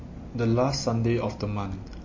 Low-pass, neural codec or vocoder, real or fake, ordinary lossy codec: 7.2 kHz; none; real; MP3, 32 kbps